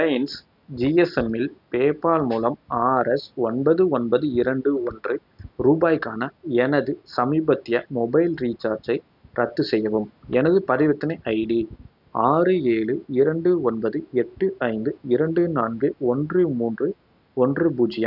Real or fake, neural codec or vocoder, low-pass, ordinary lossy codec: real; none; 5.4 kHz; none